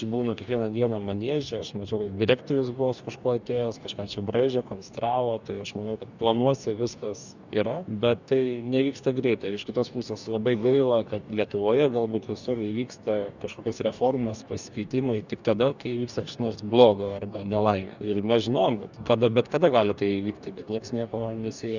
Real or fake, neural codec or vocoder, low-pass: fake; codec, 44.1 kHz, 2.6 kbps, DAC; 7.2 kHz